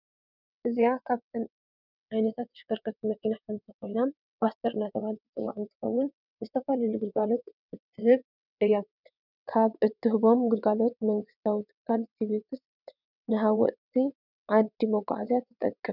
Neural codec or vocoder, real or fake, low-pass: vocoder, 22.05 kHz, 80 mel bands, WaveNeXt; fake; 5.4 kHz